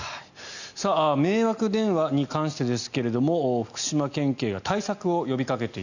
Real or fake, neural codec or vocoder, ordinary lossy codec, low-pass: real; none; none; 7.2 kHz